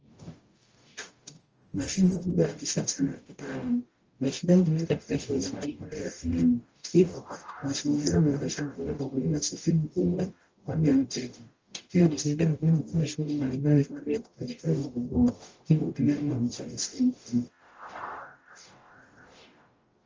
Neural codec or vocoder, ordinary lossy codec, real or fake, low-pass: codec, 44.1 kHz, 0.9 kbps, DAC; Opus, 24 kbps; fake; 7.2 kHz